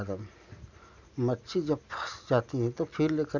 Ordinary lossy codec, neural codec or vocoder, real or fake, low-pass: none; none; real; 7.2 kHz